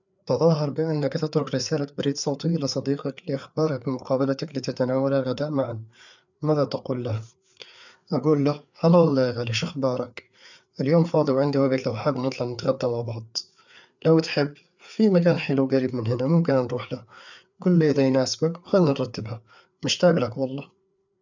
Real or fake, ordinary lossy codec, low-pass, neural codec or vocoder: fake; none; 7.2 kHz; codec, 16 kHz, 4 kbps, FreqCodec, larger model